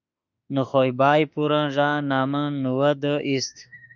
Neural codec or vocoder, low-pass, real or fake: autoencoder, 48 kHz, 32 numbers a frame, DAC-VAE, trained on Japanese speech; 7.2 kHz; fake